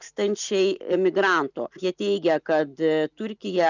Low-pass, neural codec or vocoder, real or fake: 7.2 kHz; none; real